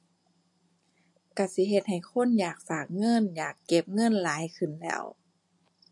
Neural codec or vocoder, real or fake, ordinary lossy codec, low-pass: none; real; MP3, 48 kbps; 10.8 kHz